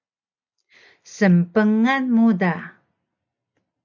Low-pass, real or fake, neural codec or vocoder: 7.2 kHz; real; none